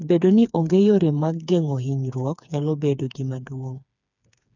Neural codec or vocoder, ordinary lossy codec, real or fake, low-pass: codec, 16 kHz, 4 kbps, FreqCodec, smaller model; none; fake; 7.2 kHz